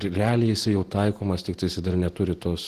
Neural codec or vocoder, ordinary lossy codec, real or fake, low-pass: none; Opus, 16 kbps; real; 14.4 kHz